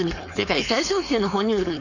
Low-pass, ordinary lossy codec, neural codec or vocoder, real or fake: 7.2 kHz; none; codec, 16 kHz, 4.8 kbps, FACodec; fake